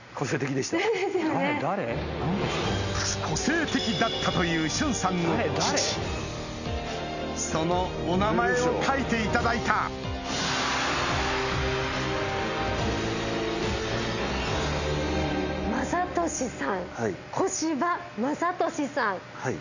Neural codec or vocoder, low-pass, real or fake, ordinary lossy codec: none; 7.2 kHz; real; none